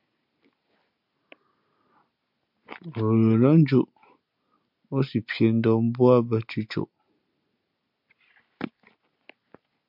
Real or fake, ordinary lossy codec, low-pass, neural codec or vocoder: real; MP3, 48 kbps; 5.4 kHz; none